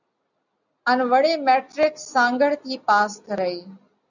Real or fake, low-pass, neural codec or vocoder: real; 7.2 kHz; none